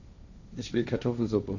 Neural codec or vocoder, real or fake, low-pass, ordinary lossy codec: codec, 16 kHz, 1.1 kbps, Voila-Tokenizer; fake; 7.2 kHz; none